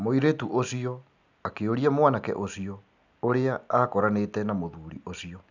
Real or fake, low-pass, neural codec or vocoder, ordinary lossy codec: real; 7.2 kHz; none; none